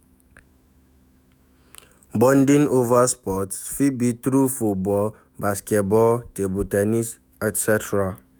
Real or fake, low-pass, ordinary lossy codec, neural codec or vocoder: fake; none; none; autoencoder, 48 kHz, 128 numbers a frame, DAC-VAE, trained on Japanese speech